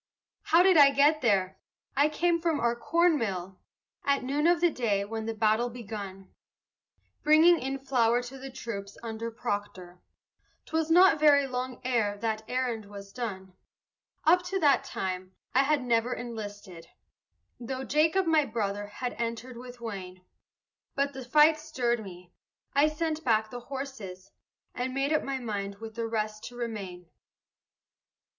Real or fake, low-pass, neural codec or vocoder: real; 7.2 kHz; none